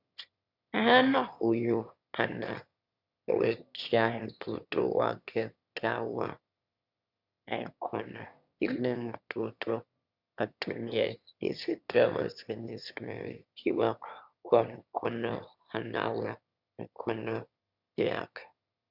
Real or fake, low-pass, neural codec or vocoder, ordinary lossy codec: fake; 5.4 kHz; autoencoder, 22.05 kHz, a latent of 192 numbers a frame, VITS, trained on one speaker; Opus, 64 kbps